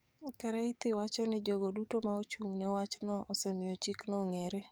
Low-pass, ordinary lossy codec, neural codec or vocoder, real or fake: none; none; codec, 44.1 kHz, 7.8 kbps, DAC; fake